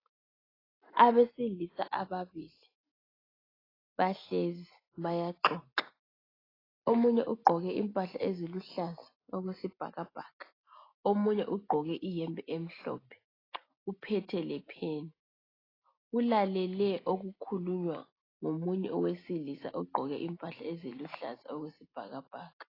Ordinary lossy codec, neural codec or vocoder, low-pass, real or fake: AAC, 24 kbps; none; 5.4 kHz; real